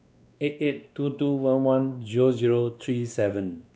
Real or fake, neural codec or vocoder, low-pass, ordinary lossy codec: fake; codec, 16 kHz, 2 kbps, X-Codec, WavLM features, trained on Multilingual LibriSpeech; none; none